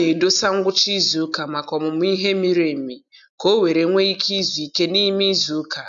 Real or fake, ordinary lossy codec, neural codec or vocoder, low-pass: real; none; none; 7.2 kHz